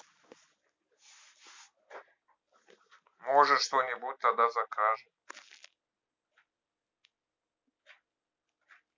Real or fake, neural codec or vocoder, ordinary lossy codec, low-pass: real; none; MP3, 64 kbps; 7.2 kHz